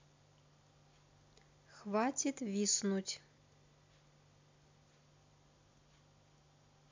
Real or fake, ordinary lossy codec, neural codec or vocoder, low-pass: real; MP3, 64 kbps; none; 7.2 kHz